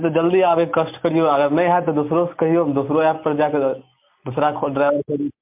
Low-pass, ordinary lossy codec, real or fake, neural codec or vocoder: 3.6 kHz; MP3, 32 kbps; real; none